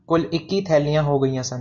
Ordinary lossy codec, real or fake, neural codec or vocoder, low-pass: MP3, 64 kbps; real; none; 7.2 kHz